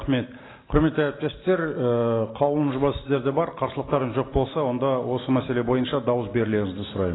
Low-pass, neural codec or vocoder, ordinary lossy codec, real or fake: 7.2 kHz; none; AAC, 16 kbps; real